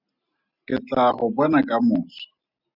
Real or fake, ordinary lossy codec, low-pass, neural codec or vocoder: real; Opus, 64 kbps; 5.4 kHz; none